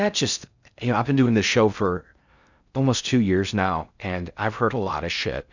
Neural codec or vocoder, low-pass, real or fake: codec, 16 kHz in and 24 kHz out, 0.6 kbps, FocalCodec, streaming, 4096 codes; 7.2 kHz; fake